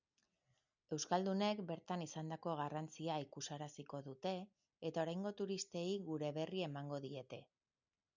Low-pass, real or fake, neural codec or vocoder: 7.2 kHz; real; none